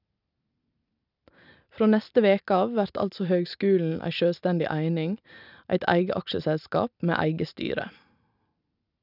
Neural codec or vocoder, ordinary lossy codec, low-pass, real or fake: none; none; 5.4 kHz; real